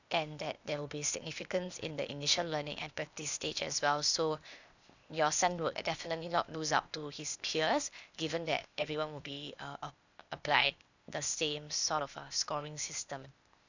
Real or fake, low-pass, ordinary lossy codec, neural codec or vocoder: fake; 7.2 kHz; none; codec, 16 kHz, 0.8 kbps, ZipCodec